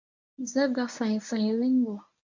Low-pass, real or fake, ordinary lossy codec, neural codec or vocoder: 7.2 kHz; fake; MP3, 48 kbps; codec, 24 kHz, 0.9 kbps, WavTokenizer, medium speech release version 1